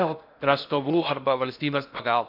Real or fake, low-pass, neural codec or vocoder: fake; 5.4 kHz; codec, 16 kHz in and 24 kHz out, 0.6 kbps, FocalCodec, streaming, 4096 codes